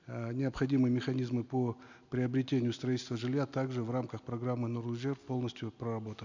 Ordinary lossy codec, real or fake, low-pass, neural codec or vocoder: none; real; 7.2 kHz; none